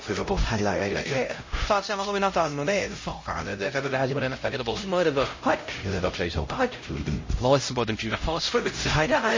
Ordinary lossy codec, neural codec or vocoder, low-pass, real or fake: MP3, 32 kbps; codec, 16 kHz, 0.5 kbps, X-Codec, HuBERT features, trained on LibriSpeech; 7.2 kHz; fake